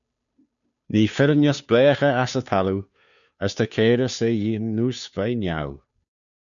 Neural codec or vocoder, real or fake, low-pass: codec, 16 kHz, 2 kbps, FunCodec, trained on Chinese and English, 25 frames a second; fake; 7.2 kHz